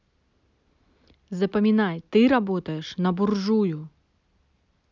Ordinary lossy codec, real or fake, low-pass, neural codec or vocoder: none; real; 7.2 kHz; none